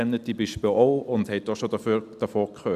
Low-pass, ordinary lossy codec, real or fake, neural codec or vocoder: 14.4 kHz; Opus, 64 kbps; real; none